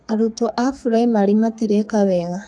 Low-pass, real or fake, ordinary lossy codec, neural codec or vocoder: 9.9 kHz; fake; AAC, 64 kbps; codec, 32 kHz, 1.9 kbps, SNAC